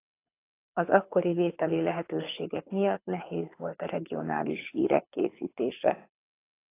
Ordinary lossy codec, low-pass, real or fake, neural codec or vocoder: AAC, 24 kbps; 3.6 kHz; fake; codec, 24 kHz, 6 kbps, HILCodec